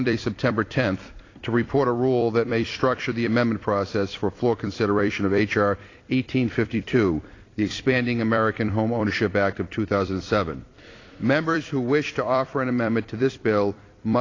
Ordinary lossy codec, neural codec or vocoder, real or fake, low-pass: AAC, 32 kbps; vocoder, 44.1 kHz, 128 mel bands every 256 samples, BigVGAN v2; fake; 7.2 kHz